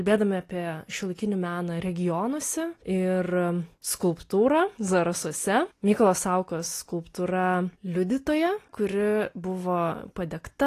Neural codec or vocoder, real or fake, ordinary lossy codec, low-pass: none; real; AAC, 48 kbps; 14.4 kHz